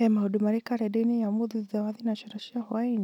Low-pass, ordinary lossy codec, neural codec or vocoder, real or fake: 19.8 kHz; none; none; real